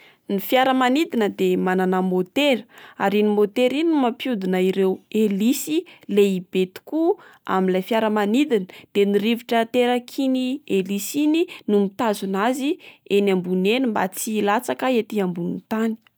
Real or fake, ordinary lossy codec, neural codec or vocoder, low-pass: real; none; none; none